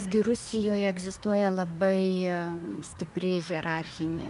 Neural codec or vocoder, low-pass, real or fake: codec, 24 kHz, 1 kbps, SNAC; 10.8 kHz; fake